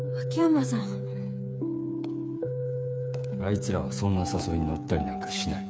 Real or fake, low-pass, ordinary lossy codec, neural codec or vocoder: fake; none; none; codec, 16 kHz, 8 kbps, FreqCodec, smaller model